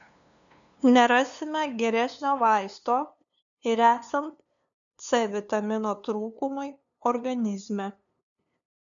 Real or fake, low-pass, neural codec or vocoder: fake; 7.2 kHz; codec, 16 kHz, 2 kbps, FunCodec, trained on LibriTTS, 25 frames a second